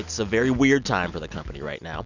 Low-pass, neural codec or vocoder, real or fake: 7.2 kHz; none; real